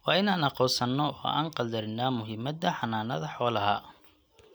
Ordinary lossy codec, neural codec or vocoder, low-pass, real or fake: none; none; none; real